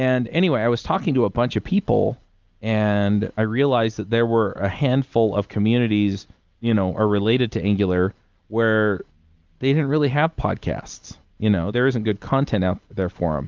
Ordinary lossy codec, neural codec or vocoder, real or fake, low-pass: Opus, 24 kbps; none; real; 7.2 kHz